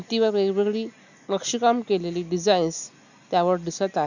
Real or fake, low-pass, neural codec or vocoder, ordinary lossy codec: real; 7.2 kHz; none; none